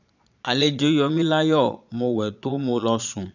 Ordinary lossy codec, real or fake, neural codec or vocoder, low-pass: none; fake; vocoder, 22.05 kHz, 80 mel bands, Vocos; 7.2 kHz